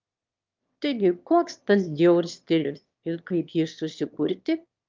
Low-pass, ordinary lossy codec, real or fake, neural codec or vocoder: 7.2 kHz; Opus, 32 kbps; fake; autoencoder, 22.05 kHz, a latent of 192 numbers a frame, VITS, trained on one speaker